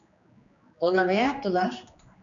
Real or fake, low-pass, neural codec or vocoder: fake; 7.2 kHz; codec, 16 kHz, 2 kbps, X-Codec, HuBERT features, trained on general audio